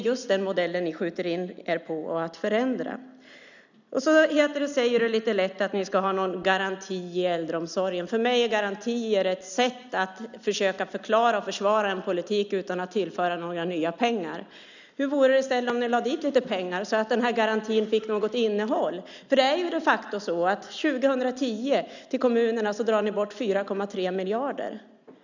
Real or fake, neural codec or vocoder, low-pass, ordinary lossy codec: real; none; 7.2 kHz; none